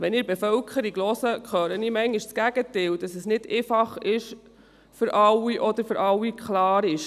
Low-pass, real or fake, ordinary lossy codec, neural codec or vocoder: 14.4 kHz; real; none; none